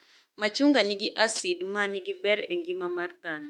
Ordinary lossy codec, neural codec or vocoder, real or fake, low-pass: MP3, 96 kbps; autoencoder, 48 kHz, 32 numbers a frame, DAC-VAE, trained on Japanese speech; fake; 19.8 kHz